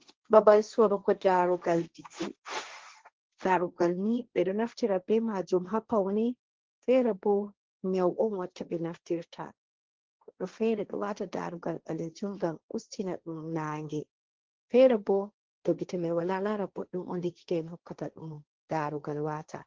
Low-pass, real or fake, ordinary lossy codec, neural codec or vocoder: 7.2 kHz; fake; Opus, 16 kbps; codec, 16 kHz, 1.1 kbps, Voila-Tokenizer